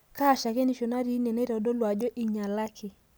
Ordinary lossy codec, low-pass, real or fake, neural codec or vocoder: none; none; real; none